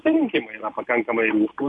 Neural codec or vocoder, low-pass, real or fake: none; 10.8 kHz; real